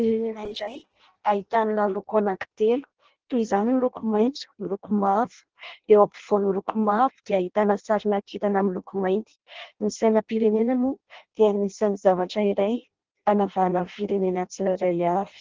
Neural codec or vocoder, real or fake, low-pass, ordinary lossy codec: codec, 16 kHz in and 24 kHz out, 0.6 kbps, FireRedTTS-2 codec; fake; 7.2 kHz; Opus, 16 kbps